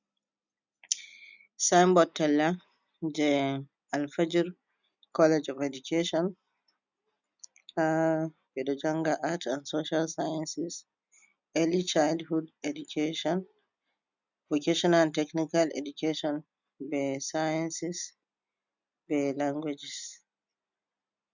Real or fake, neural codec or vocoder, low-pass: real; none; 7.2 kHz